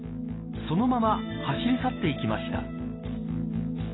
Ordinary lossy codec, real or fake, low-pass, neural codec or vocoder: AAC, 16 kbps; real; 7.2 kHz; none